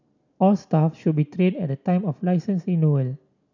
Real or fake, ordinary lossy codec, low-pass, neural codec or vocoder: real; none; 7.2 kHz; none